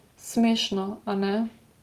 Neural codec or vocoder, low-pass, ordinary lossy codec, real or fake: none; 14.4 kHz; Opus, 24 kbps; real